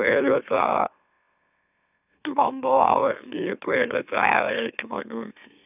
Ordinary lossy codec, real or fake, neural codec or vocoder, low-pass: none; fake; autoencoder, 44.1 kHz, a latent of 192 numbers a frame, MeloTTS; 3.6 kHz